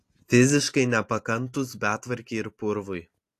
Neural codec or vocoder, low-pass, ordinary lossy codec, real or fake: none; 14.4 kHz; AAC, 64 kbps; real